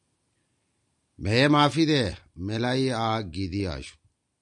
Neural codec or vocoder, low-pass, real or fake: none; 10.8 kHz; real